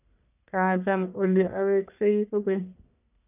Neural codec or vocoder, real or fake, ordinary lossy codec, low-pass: codec, 44.1 kHz, 1.7 kbps, Pupu-Codec; fake; none; 3.6 kHz